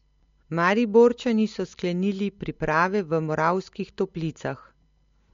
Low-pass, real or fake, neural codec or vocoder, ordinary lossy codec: 7.2 kHz; real; none; MP3, 48 kbps